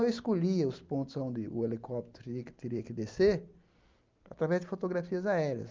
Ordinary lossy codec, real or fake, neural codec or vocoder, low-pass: Opus, 24 kbps; real; none; 7.2 kHz